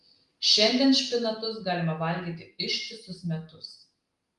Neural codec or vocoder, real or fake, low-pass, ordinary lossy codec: none; real; 10.8 kHz; Opus, 32 kbps